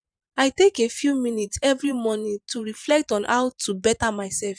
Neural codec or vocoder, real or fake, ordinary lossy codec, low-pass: vocoder, 44.1 kHz, 128 mel bands every 512 samples, BigVGAN v2; fake; none; 9.9 kHz